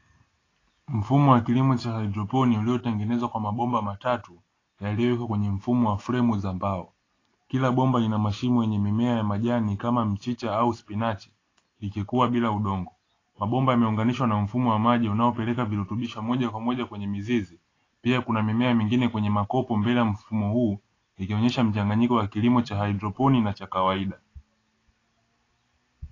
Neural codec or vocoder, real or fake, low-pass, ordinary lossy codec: none; real; 7.2 kHz; AAC, 32 kbps